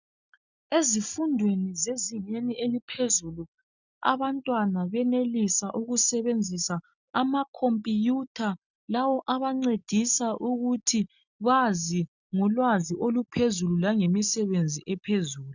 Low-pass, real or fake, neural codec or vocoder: 7.2 kHz; real; none